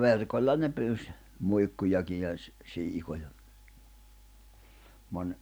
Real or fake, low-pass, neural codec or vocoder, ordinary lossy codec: real; none; none; none